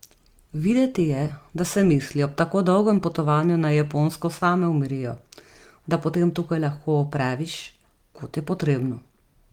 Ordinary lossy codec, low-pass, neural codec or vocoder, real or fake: Opus, 24 kbps; 19.8 kHz; none; real